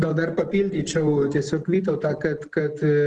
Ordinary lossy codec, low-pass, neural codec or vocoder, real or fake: Opus, 16 kbps; 10.8 kHz; none; real